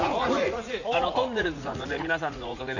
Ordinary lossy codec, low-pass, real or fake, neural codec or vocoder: none; 7.2 kHz; fake; vocoder, 44.1 kHz, 80 mel bands, Vocos